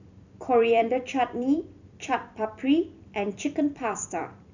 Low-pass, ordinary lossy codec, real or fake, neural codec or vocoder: 7.2 kHz; none; fake; vocoder, 44.1 kHz, 128 mel bands every 256 samples, BigVGAN v2